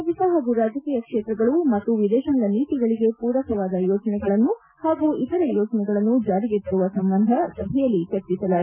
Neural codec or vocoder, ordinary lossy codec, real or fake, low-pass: none; none; real; 3.6 kHz